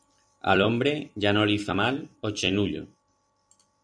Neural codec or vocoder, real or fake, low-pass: vocoder, 44.1 kHz, 128 mel bands every 256 samples, BigVGAN v2; fake; 9.9 kHz